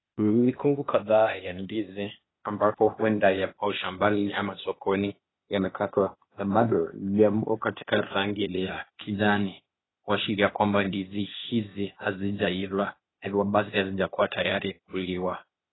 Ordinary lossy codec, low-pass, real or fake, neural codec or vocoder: AAC, 16 kbps; 7.2 kHz; fake; codec, 16 kHz, 0.8 kbps, ZipCodec